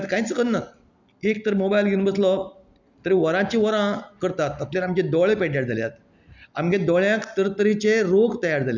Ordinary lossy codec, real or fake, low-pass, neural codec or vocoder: none; real; 7.2 kHz; none